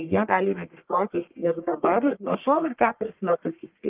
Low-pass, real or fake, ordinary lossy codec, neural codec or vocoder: 3.6 kHz; fake; Opus, 32 kbps; codec, 44.1 kHz, 1.7 kbps, Pupu-Codec